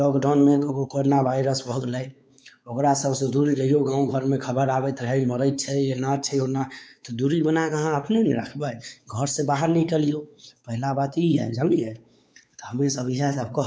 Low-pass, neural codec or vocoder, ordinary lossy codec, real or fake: none; codec, 16 kHz, 4 kbps, X-Codec, WavLM features, trained on Multilingual LibriSpeech; none; fake